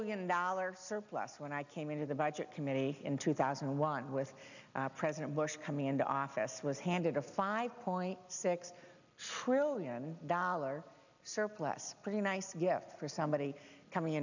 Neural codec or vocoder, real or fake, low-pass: none; real; 7.2 kHz